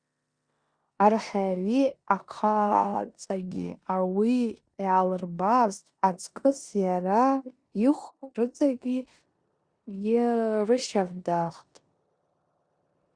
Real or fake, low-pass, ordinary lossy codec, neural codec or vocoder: fake; 9.9 kHz; Opus, 64 kbps; codec, 16 kHz in and 24 kHz out, 0.9 kbps, LongCat-Audio-Codec, four codebook decoder